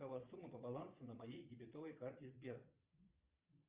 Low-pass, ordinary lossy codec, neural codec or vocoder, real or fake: 3.6 kHz; Opus, 32 kbps; vocoder, 22.05 kHz, 80 mel bands, Vocos; fake